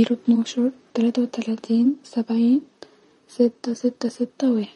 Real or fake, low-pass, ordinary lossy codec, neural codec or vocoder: fake; 19.8 kHz; MP3, 48 kbps; vocoder, 44.1 kHz, 128 mel bands, Pupu-Vocoder